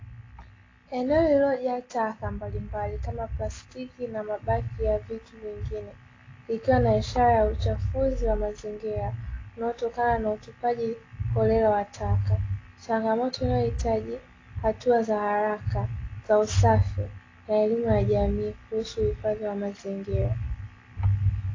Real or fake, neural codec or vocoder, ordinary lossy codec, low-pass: real; none; AAC, 32 kbps; 7.2 kHz